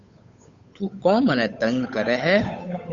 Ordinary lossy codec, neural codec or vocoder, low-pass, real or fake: Opus, 64 kbps; codec, 16 kHz, 8 kbps, FunCodec, trained on Chinese and English, 25 frames a second; 7.2 kHz; fake